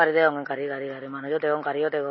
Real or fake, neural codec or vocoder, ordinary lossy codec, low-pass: real; none; MP3, 24 kbps; 7.2 kHz